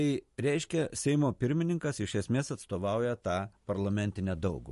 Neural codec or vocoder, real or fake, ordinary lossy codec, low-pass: vocoder, 44.1 kHz, 128 mel bands every 512 samples, BigVGAN v2; fake; MP3, 48 kbps; 14.4 kHz